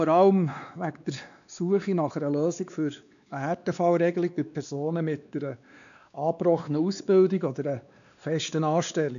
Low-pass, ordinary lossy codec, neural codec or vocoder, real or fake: 7.2 kHz; none; codec, 16 kHz, 2 kbps, X-Codec, WavLM features, trained on Multilingual LibriSpeech; fake